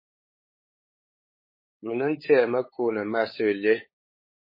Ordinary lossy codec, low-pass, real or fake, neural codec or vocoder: MP3, 24 kbps; 5.4 kHz; fake; codec, 16 kHz, 8 kbps, FunCodec, trained on LibriTTS, 25 frames a second